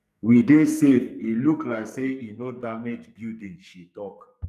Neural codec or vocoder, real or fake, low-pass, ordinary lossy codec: codec, 44.1 kHz, 2.6 kbps, SNAC; fake; 14.4 kHz; none